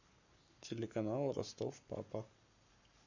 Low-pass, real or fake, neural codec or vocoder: 7.2 kHz; fake; codec, 44.1 kHz, 7.8 kbps, Pupu-Codec